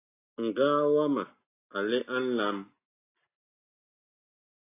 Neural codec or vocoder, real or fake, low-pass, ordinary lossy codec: none; real; 3.6 kHz; AAC, 24 kbps